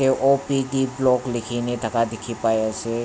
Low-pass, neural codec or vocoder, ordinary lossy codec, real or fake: none; none; none; real